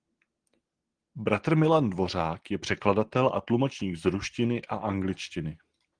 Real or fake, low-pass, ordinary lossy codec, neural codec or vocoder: real; 9.9 kHz; Opus, 16 kbps; none